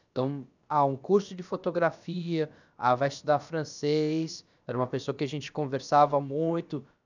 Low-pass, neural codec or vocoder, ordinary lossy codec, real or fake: 7.2 kHz; codec, 16 kHz, about 1 kbps, DyCAST, with the encoder's durations; none; fake